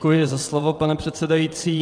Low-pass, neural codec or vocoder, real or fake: 9.9 kHz; vocoder, 22.05 kHz, 80 mel bands, WaveNeXt; fake